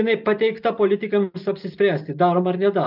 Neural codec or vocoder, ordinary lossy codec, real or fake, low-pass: none; AAC, 48 kbps; real; 5.4 kHz